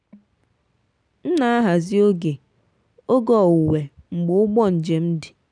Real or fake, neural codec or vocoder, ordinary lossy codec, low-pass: real; none; none; 9.9 kHz